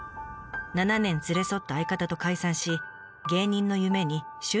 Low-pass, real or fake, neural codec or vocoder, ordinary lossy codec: none; real; none; none